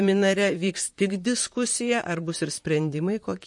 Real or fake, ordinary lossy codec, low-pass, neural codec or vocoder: fake; MP3, 48 kbps; 10.8 kHz; vocoder, 44.1 kHz, 128 mel bands, Pupu-Vocoder